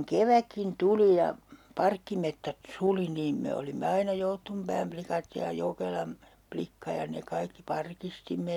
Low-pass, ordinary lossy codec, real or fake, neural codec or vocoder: 19.8 kHz; none; real; none